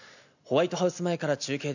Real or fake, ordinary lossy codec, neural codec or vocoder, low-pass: real; none; none; 7.2 kHz